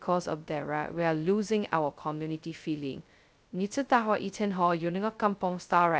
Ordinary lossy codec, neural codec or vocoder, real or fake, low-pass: none; codec, 16 kHz, 0.2 kbps, FocalCodec; fake; none